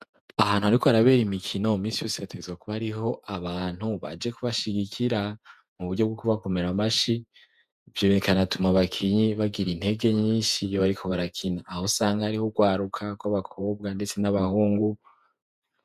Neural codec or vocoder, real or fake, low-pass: vocoder, 48 kHz, 128 mel bands, Vocos; fake; 14.4 kHz